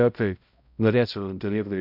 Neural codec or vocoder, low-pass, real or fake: codec, 16 kHz, 0.5 kbps, X-Codec, HuBERT features, trained on balanced general audio; 5.4 kHz; fake